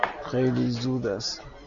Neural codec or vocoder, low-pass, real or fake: none; 7.2 kHz; real